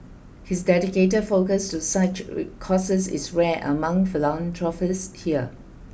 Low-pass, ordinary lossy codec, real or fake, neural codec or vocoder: none; none; real; none